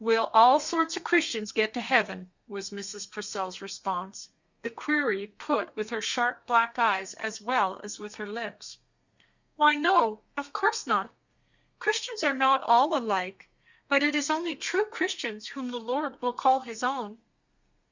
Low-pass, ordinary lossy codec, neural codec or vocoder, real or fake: 7.2 kHz; Opus, 64 kbps; codec, 32 kHz, 1.9 kbps, SNAC; fake